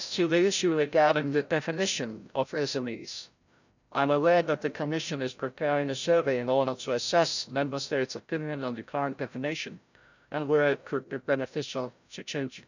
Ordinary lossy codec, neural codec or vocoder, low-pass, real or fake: none; codec, 16 kHz, 0.5 kbps, FreqCodec, larger model; 7.2 kHz; fake